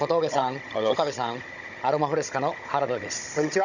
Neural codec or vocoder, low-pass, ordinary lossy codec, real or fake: codec, 16 kHz, 16 kbps, FunCodec, trained on Chinese and English, 50 frames a second; 7.2 kHz; none; fake